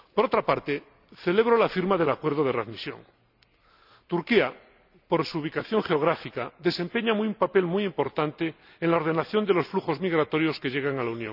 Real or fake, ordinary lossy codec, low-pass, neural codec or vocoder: real; none; 5.4 kHz; none